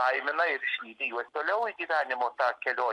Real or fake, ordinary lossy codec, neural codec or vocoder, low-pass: real; AAC, 64 kbps; none; 10.8 kHz